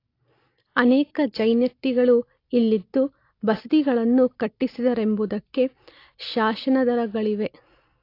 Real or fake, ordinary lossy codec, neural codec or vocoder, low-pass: real; AAC, 32 kbps; none; 5.4 kHz